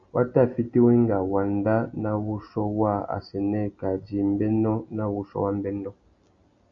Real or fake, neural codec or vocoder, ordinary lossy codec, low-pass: real; none; Opus, 64 kbps; 7.2 kHz